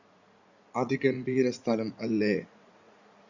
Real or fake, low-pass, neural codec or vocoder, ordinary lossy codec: fake; 7.2 kHz; vocoder, 44.1 kHz, 80 mel bands, Vocos; Opus, 64 kbps